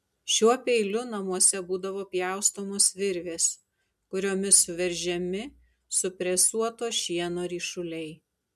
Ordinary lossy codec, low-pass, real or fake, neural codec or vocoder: MP3, 96 kbps; 14.4 kHz; real; none